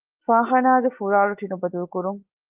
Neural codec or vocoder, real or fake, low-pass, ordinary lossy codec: none; real; 3.6 kHz; Opus, 24 kbps